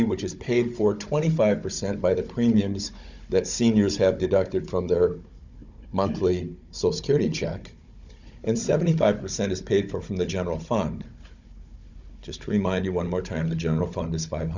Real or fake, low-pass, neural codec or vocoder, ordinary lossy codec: fake; 7.2 kHz; codec, 16 kHz, 16 kbps, FunCodec, trained on Chinese and English, 50 frames a second; Opus, 64 kbps